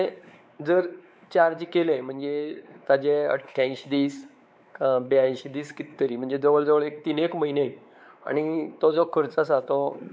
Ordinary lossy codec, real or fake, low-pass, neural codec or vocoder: none; fake; none; codec, 16 kHz, 4 kbps, X-Codec, WavLM features, trained on Multilingual LibriSpeech